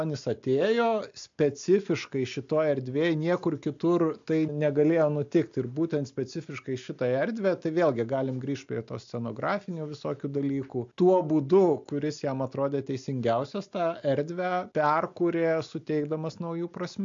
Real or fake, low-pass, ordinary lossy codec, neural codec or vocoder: real; 7.2 kHz; AAC, 64 kbps; none